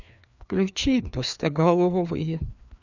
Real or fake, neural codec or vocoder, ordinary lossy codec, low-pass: fake; codec, 16 kHz, 2 kbps, FreqCodec, larger model; none; 7.2 kHz